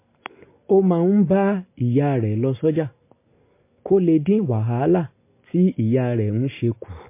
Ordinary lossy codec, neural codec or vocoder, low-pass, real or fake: MP3, 24 kbps; none; 3.6 kHz; real